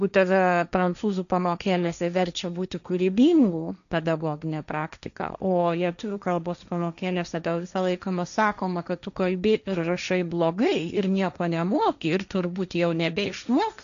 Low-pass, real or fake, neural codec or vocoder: 7.2 kHz; fake; codec, 16 kHz, 1.1 kbps, Voila-Tokenizer